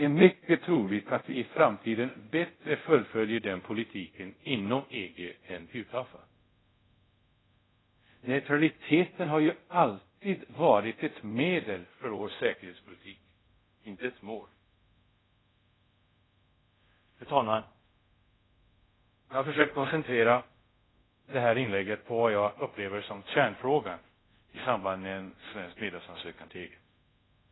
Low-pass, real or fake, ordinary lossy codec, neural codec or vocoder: 7.2 kHz; fake; AAC, 16 kbps; codec, 24 kHz, 0.5 kbps, DualCodec